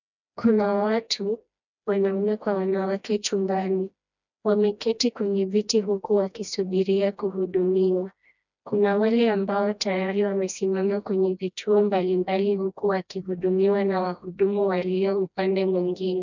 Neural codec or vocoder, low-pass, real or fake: codec, 16 kHz, 1 kbps, FreqCodec, smaller model; 7.2 kHz; fake